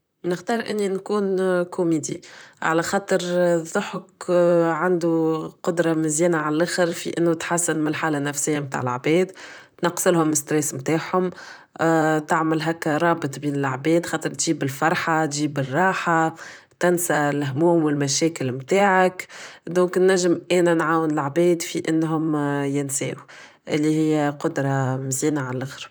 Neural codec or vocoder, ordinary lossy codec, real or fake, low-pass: vocoder, 44.1 kHz, 128 mel bands, Pupu-Vocoder; none; fake; none